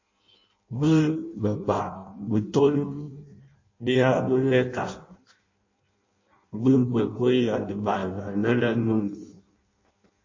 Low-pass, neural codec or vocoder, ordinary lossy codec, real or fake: 7.2 kHz; codec, 16 kHz in and 24 kHz out, 0.6 kbps, FireRedTTS-2 codec; MP3, 32 kbps; fake